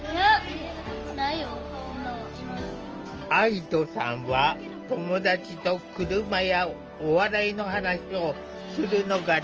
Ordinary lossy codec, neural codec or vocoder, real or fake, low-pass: Opus, 24 kbps; none; real; 7.2 kHz